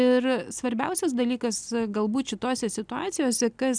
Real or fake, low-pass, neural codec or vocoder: real; 9.9 kHz; none